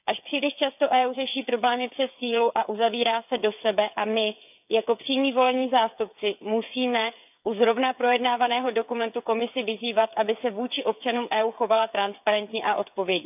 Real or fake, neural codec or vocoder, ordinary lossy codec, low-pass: fake; codec, 16 kHz, 8 kbps, FreqCodec, smaller model; none; 3.6 kHz